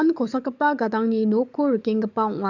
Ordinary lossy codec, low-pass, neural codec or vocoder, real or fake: none; 7.2 kHz; codec, 44.1 kHz, 7.8 kbps, DAC; fake